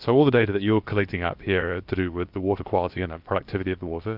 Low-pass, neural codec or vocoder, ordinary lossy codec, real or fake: 5.4 kHz; codec, 16 kHz, about 1 kbps, DyCAST, with the encoder's durations; Opus, 32 kbps; fake